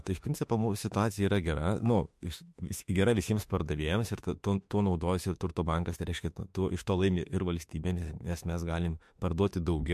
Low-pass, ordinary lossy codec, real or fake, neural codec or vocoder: 14.4 kHz; MP3, 64 kbps; fake; autoencoder, 48 kHz, 32 numbers a frame, DAC-VAE, trained on Japanese speech